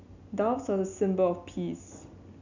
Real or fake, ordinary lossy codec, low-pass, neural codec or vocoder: real; none; 7.2 kHz; none